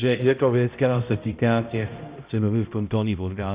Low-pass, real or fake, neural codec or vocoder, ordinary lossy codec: 3.6 kHz; fake; codec, 16 kHz, 0.5 kbps, X-Codec, HuBERT features, trained on balanced general audio; Opus, 64 kbps